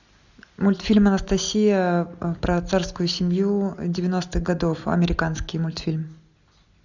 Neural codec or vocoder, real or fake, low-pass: none; real; 7.2 kHz